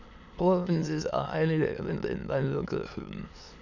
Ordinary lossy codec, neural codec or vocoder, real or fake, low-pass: Opus, 64 kbps; autoencoder, 22.05 kHz, a latent of 192 numbers a frame, VITS, trained on many speakers; fake; 7.2 kHz